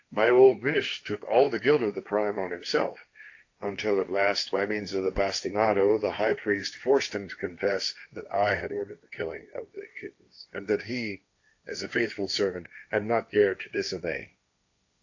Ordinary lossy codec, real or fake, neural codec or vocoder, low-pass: AAC, 48 kbps; fake; codec, 16 kHz, 1.1 kbps, Voila-Tokenizer; 7.2 kHz